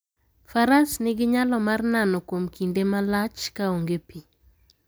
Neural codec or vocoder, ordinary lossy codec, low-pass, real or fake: none; none; none; real